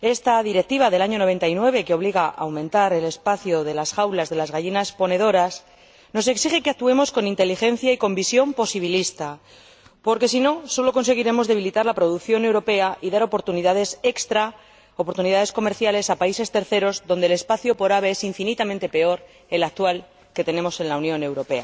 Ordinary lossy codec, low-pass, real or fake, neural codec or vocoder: none; none; real; none